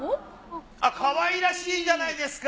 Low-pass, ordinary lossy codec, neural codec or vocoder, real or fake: none; none; none; real